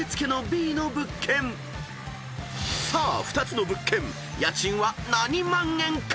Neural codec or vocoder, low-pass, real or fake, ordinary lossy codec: none; none; real; none